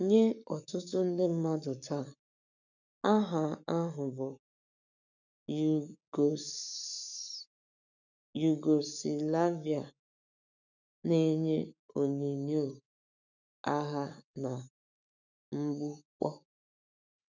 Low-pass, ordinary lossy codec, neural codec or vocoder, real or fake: 7.2 kHz; none; codec, 44.1 kHz, 7.8 kbps, Pupu-Codec; fake